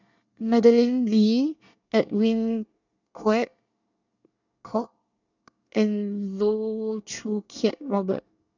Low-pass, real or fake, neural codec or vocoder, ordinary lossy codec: 7.2 kHz; fake; codec, 24 kHz, 1 kbps, SNAC; none